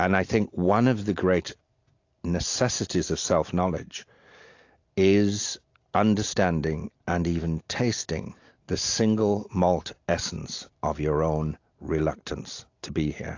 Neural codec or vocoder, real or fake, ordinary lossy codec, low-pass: none; real; AAC, 48 kbps; 7.2 kHz